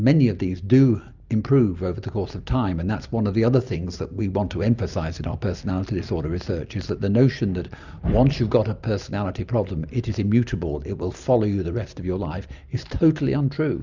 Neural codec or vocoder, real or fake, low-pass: none; real; 7.2 kHz